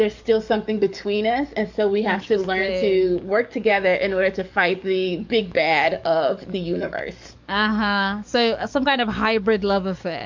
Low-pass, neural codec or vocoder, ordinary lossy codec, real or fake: 7.2 kHz; codec, 44.1 kHz, 7.8 kbps, DAC; MP3, 64 kbps; fake